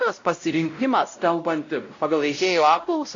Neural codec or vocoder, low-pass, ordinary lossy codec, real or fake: codec, 16 kHz, 0.5 kbps, X-Codec, WavLM features, trained on Multilingual LibriSpeech; 7.2 kHz; AAC, 48 kbps; fake